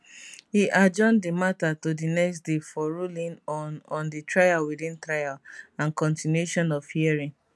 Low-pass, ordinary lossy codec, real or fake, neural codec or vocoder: none; none; real; none